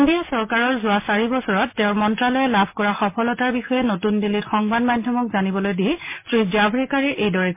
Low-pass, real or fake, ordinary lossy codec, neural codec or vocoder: 3.6 kHz; real; MP3, 24 kbps; none